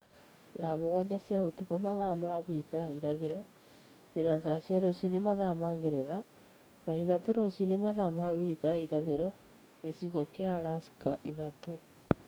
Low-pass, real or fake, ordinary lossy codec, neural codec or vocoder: none; fake; none; codec, 44.1 kHz, 2.6 kbps, DAC